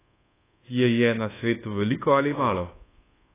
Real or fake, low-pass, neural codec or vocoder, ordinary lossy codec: fake; 3.6 kHz; codec, 24 kHz, 1.2 kbps, DualCodec; AAC, 16 kbps